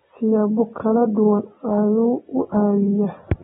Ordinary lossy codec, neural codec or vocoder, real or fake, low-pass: AAC, 16 kbps; none; real; 19.8 kHz